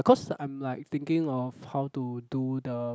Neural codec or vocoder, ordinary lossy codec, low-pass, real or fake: none; none; none; real